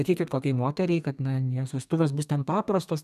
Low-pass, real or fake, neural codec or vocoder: 14.4 kHz; fake; codec, 32 kHz, 1.9 kbps, SNAC